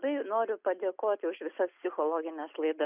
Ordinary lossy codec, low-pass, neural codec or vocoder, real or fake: Opus, 64 kbps; 3.6 kHz; none; real